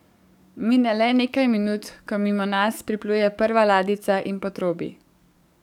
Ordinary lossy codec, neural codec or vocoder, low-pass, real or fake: none; codec, 44.1 kHz, 7.8 kbps, DAC; 19.8 kHz; fake